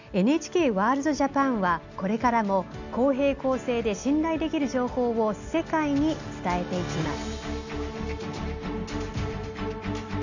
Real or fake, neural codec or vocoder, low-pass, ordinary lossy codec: real; none; 7.2 kHz; none